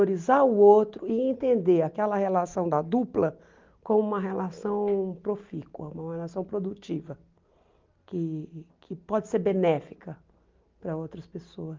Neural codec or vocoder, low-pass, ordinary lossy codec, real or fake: none; 7.2 kHz; Opus, 32 kbps; real